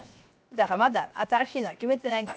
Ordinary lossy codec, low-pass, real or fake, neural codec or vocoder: none; none; fake; codec, 16 kHz, 0.7 kbps, FocalCodec